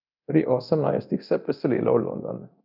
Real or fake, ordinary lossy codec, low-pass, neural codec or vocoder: fake; none; 5.4 kHz; codec, 24 kHz, 0.9 kbps, DualCodec